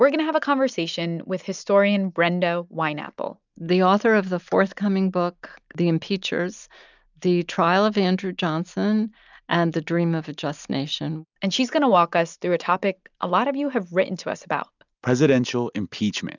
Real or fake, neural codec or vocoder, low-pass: real; none; 7.2 kHz